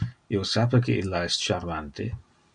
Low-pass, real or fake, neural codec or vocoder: 9.9 kHz; real; none